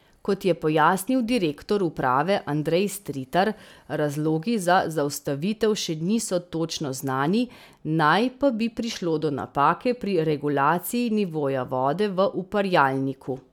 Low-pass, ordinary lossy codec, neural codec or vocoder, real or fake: 19.8 kHz; none; none; real